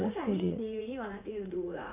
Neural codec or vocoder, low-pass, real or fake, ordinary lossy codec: vocoder, 44.1 kHz, 80 mel bands, Vocos; 3.6 kHz; fake; none